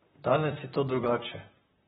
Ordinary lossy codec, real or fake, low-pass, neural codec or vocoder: AAC, 16 kbps; fake; 19.8 kHz; vocoder, 44.1 kHz, 128 mel bands, Pupu-Vocoder